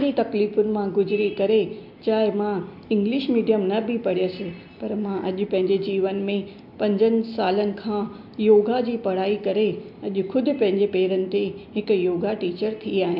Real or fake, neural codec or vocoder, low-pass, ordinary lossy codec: real; none; 5.4 kHz; MP3, 48 kbps